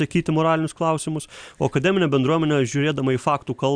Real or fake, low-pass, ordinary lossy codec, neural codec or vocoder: real; 9.9 kHz; Opus, 64 kbps; none